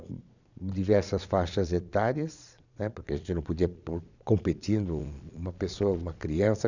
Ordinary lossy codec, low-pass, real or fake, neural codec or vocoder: none; 7.2 kHz; fake; vocoder, 22.05 kHz, 80 mel bands, Vocos